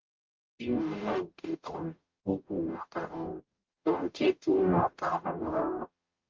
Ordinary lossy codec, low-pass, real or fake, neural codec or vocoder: Opus, 24 kbps; 7.2 kHz; fake; codec, 44.1 kHz, 0.9 kbps, DAC